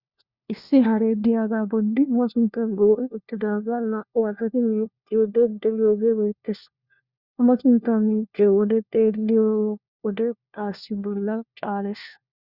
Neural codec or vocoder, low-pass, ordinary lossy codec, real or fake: codec, 16 kHz, 1 kbps, FunCodec, trained on LibriTTS, 50 frames a second; 5.4 kHz; Opus, 64 kbps; fake